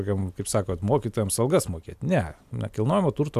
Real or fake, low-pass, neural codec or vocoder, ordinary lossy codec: real; 14.4 kHz; none; AAC, 96 kbps